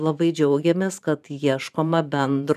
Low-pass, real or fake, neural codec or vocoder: 14.4 kHz; fake; vocoder, 44.1 kHz, 128 mel bands every 512 samples, BigVGAN v2